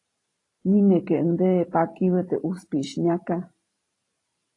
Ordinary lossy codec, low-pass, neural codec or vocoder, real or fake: AAC, 32 kbps; 10.8 kHz; vocoder, 44.1 kHz, 128 mel bands every 512 samples, BigVGAN v2; fake